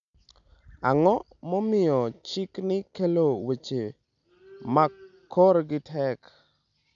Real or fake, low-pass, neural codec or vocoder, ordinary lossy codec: real; 7.2 kHz; none; none